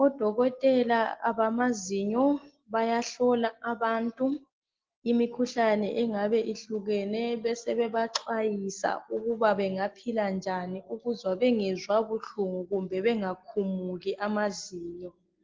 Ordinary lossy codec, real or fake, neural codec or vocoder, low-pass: Opus, 16 kbps; real; none; 7.2 kHz